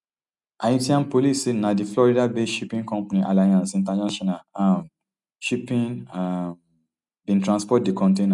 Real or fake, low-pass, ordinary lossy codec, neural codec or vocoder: real; 10.8 kHz; none; none